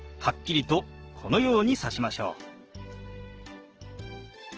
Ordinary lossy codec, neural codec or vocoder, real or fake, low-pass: Opus, 16 kbps; codec, 44.1 kHz, 7.8 kbps, DAC; fake; 7.2 kHz